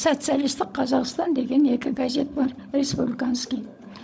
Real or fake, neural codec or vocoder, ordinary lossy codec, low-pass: fake; codec, 16 kHz, 16 kbps, FunCodec, trained on LibriTTS, 50 frames a second; none; none